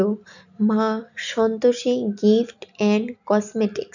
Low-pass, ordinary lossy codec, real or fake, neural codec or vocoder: 7.2 kHz; none; real; none